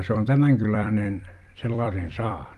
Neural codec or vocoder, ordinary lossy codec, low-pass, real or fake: vocoder, 44.1 kHz, 128 mel bands every 256 samples, BigVGAN v2; Opus, 32 kbps; 14.4 kHz; fake